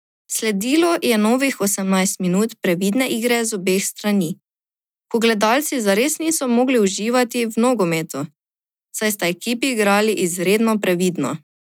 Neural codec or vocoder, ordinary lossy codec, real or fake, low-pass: none; none; real; 19.8 kHz